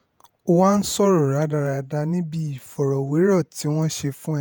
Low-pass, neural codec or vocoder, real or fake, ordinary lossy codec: none; vocoder, 48 kHz, 128 mel bands, Vocos; fake; none